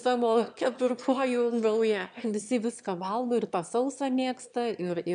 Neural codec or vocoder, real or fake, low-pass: autoencoder, 22.05 kHz, a latent of 192 numbers a frame, VITS, trained on one speaker; fake; 9.9 kHz